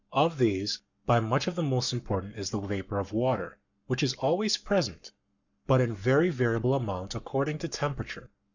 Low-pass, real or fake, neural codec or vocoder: 7.2 kHz; fake; codec, 44.1 kHz, 7.8 kbps, Pupu-Codec